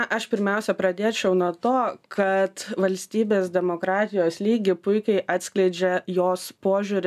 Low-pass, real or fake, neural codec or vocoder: 14.4 kHz; real; none